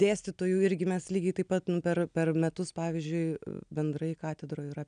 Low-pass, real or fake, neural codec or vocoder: 9.9 kHz; real; none